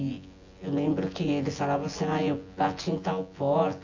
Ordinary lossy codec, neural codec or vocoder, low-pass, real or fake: AAC, 48 kbps; vocoder, 24 kHz, 100 mel bands, Vocos; 7.2 kHz; fake